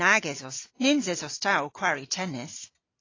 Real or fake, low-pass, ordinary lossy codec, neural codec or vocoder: real; 7.2 kHz; AAC, 32 kbps; none